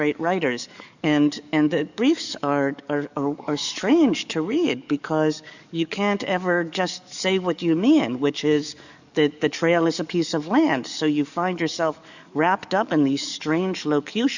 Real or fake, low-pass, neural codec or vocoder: fake; 7.2 kHz; codec, 44.1 kHz, 7.8 kbps, DAC